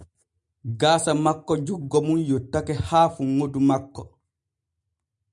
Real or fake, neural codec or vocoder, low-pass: real; none; 10.8 kHz